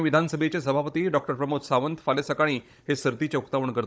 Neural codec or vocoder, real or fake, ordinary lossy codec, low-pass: codec, 16 kHz, 16 kbps, FunCodec, trained on Chinese and English, 50 frames a second; fake; none; none